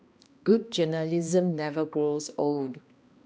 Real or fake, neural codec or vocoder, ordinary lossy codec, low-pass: fake; codec, 16 kHz, 1 kbps, X-Codec, HuBERT features, trained on balanced general audio; none; none